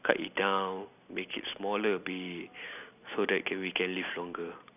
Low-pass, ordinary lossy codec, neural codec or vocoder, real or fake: 3.6 kHz; none; none; real